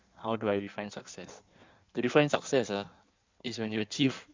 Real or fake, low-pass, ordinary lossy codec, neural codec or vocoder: fake; 7.2 kHz; MP3, 64 kbps; codec, 16 kHz in and 24 kHz out, 1.1 kbps, FireRedTTS-2 codec